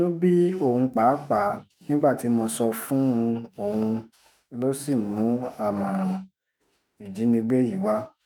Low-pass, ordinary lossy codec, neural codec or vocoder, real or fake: none; none; autoencoder, 48 kHz, 32 numbers a frame, DAC-VAE, trained on Japanese speech; fake